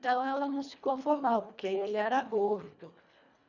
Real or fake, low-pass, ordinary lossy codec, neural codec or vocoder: fake; 7.2 kHz; none; codec, 24 kHz, 1.5 kbps, HILCodec